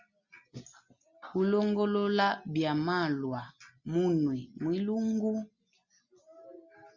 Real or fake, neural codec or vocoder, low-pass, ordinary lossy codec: real; none; 7.2 kHz; Opus, 64 kbps